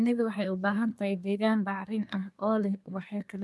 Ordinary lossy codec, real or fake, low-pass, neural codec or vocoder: none; fake; none; codec, 24 kHz, 1 kbps, SNAC